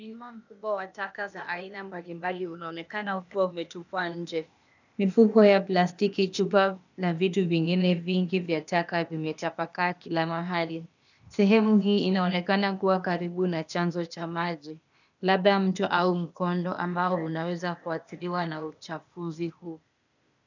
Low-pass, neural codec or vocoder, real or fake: 7.2 kHz; codec, 16 kHz, 0.8 kbps, ZipCodec; fake